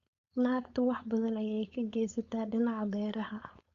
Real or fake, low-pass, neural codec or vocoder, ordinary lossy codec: fake; 7.2 kHz; codec, 16 kHz, 4.8 kbps, FACodec; Opus, 64 kbps